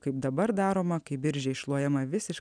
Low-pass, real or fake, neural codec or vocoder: 9.9 kHz; real; none